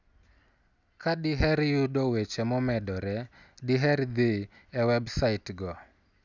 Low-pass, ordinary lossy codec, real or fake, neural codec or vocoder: 7.2 kHz; none; real; none